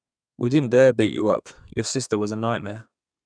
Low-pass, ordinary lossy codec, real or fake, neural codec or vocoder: 9.9 kHz; none; fake; codec, 32 kHz, 1.9 kbps, SNAC